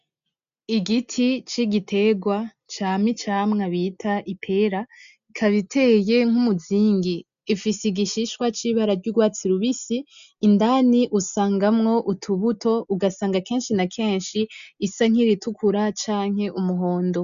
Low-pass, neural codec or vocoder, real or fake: 7.2 kHz; none; real